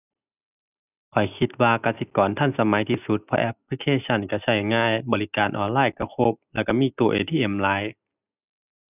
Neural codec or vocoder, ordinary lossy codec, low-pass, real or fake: none; none; 3.6 kHz; real